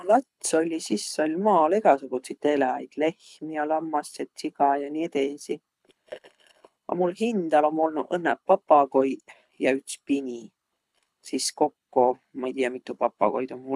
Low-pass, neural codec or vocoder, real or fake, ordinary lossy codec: none; codec, 24 kHz, 6 kbps, HILCodec; fake; none